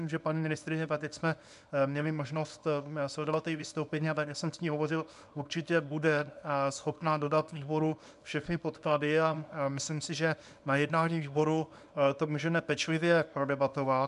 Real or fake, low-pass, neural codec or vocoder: fake; 10.8 kHz; codec, 24 kHz, 0.9 kbps, WavTokenizer, small release